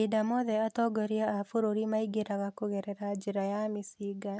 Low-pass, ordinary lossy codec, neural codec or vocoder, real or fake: none; none; none; real